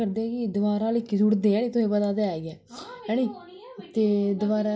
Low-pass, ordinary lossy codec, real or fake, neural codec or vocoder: none; none; real; none